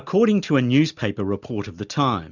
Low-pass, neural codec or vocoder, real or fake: 7.2 kHz; none; real